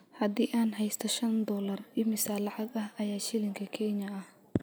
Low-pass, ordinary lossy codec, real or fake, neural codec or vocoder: none; none; real; none